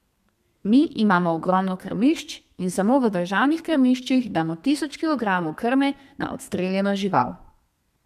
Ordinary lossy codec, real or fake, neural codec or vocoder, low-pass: MP3, 96 kbps; fake; codec, 32 kHz, 1.9 kbps, SNAC; 14.4 kHz